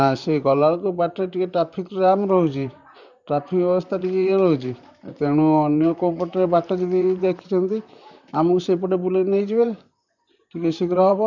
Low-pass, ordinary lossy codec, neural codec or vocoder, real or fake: 7.2 kHz; none; vocoder, 44.1 kHz, 128 mel bands, Pupu-Vocoder; fake